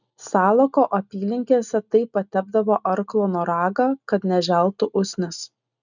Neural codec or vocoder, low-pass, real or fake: none; 7.2 kHz; real